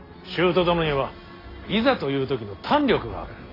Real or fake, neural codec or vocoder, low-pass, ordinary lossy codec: real; none; 5.4 kHz; AAC, 24 kbps